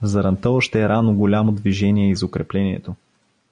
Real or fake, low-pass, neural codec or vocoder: real; 9.9 kHz; none